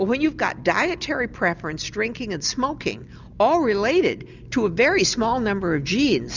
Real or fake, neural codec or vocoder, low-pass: real; none; 7.2 kHz